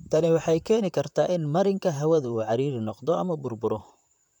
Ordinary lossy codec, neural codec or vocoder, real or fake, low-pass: none; vocoder, 44.1 kHz, 128 mel bands, Pupu-Vocoder; fake; 19.8 kHz